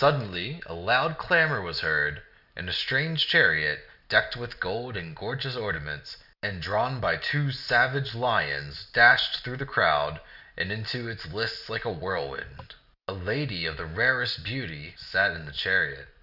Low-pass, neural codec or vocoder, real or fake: 5.4 kHz; none; real